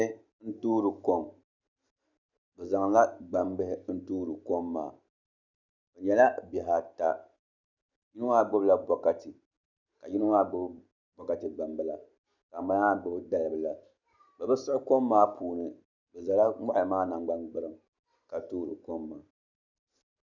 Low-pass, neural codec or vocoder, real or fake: 7.2 kHz; none; real